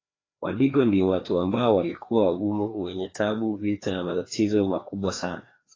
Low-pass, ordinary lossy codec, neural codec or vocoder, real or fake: 7.2 kHz; AAC, 32 kbps; codec, 16 kHz, 2 kbps, FreqCodec, larger model; fake